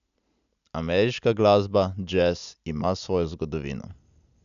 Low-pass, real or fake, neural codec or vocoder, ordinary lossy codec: 7.2 kHz; real; none; none